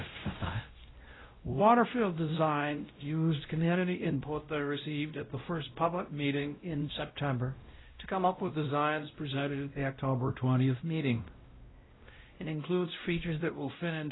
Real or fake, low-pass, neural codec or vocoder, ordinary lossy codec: fake; 7.2 kHz; codec, 16 kHz, 0.5 kbps, X-Codec, WavLM features, trained on Multilingual LibriSpeech; AAC, 16 kbps